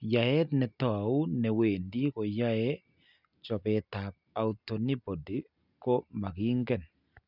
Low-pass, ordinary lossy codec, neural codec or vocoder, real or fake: 5.4 kHz; none; none; real